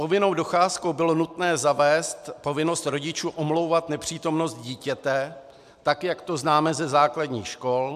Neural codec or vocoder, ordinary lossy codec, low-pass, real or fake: none; MP3, 96 kbps; 14.4 kHz; real